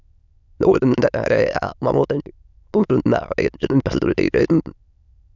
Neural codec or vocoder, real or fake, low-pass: autoencoder, 22.05 kHz, a latent of 192 numbers a frame, VITS, trained on many speakers; fake; 7.2 kHz